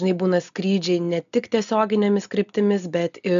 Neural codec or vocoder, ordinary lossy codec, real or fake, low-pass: none; MP3, 96 kbps; real; 7.2 kHz